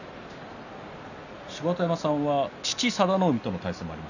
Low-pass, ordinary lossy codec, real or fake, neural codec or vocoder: 7.2 kHz; none; real; none